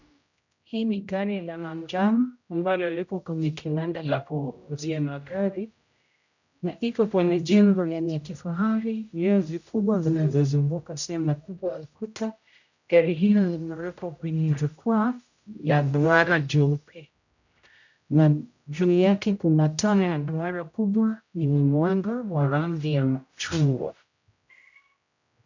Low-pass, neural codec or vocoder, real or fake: 7.2 kHz; codec, 16 kHz, 0.5 kbps, X-Codec, HuBERT features, trained on general audio; fake